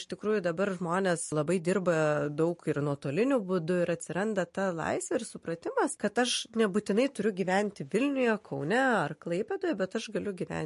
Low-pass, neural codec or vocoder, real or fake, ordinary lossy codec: 14.4 kHz; none; real; MP3, 48 kbps